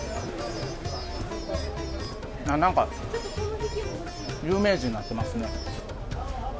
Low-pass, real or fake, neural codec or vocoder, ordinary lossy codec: none; real; none; none